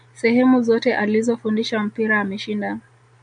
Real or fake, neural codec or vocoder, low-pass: real; none; 9.9 kHz